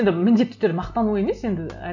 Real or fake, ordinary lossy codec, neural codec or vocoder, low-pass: real; none; none; 7.2 kHz